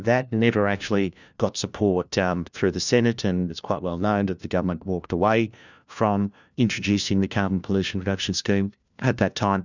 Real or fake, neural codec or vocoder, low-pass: fake; codec, 16 kHz, 1 kbps, FunCodec, trained on LibriTTS, 50 frames a second; 7.2 kHz